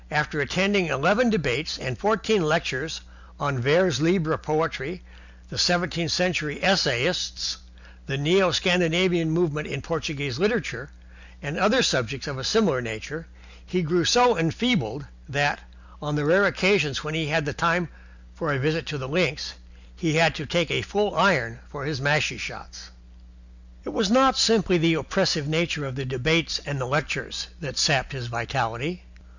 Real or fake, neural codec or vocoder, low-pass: real; none; 7.2 kHz